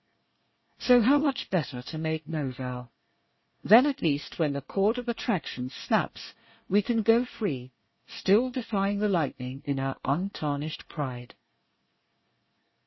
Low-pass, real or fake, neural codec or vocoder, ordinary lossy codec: 7.2 kHz; fake; codec, 24 kHz, 1 kbps, SNAC; MP3, 24 kbps